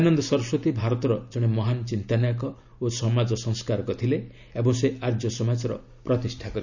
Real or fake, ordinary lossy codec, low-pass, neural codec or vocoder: real; none; 7.2 kHz; none